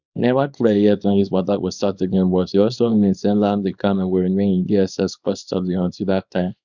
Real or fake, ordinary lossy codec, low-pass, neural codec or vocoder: fake; none; 7.2 kHz; codec, 24 kHz, 0.9 kbps, WavTokenizer, small release